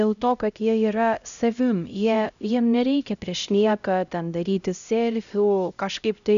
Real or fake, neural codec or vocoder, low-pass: fake; codec, 16 kHz, 0.5 kbps, X-Codec, HuBERT features, trained on LibriSpeech; 7.2 kHz